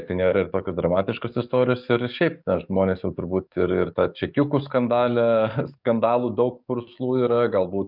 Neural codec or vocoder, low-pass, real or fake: codec, 44.1 kHz, 7.8 kbps, DAC; 5.4 kHz; fake